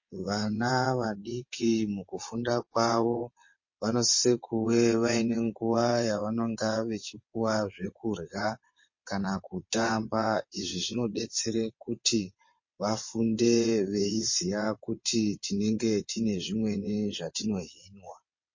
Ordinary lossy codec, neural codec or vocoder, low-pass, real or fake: MP3, 32 kbps; vocoder, 22.05 kHz, 80 mel bands, WaveNeXt; 7.2 kHz; fake